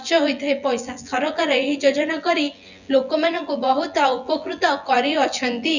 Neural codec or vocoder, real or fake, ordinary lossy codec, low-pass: vocoder, 24 kHz, 100 mel bands, Vocos; fake; none; 7.2 kHz